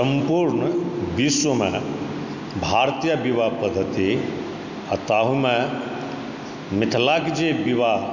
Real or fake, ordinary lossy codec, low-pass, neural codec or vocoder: real; none; 7.2 kHz; none